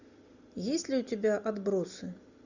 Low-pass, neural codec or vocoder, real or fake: 7.2 kHz; none; real